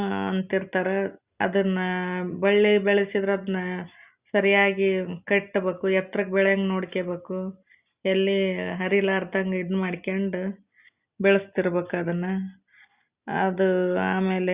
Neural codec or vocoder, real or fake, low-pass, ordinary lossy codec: none; real; 3.6 kHz; Opus, 64 kbps